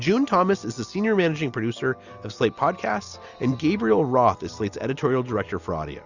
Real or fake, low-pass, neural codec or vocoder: real; 7.2 kHz; none